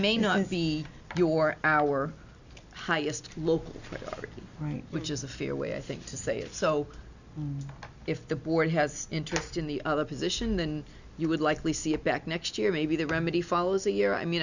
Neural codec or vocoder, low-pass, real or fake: none; 7.2 kHz; real